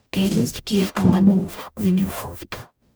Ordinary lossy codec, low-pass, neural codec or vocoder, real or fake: none; none; codec, 44.1 kHz, 0.9 kbps, DAC; fake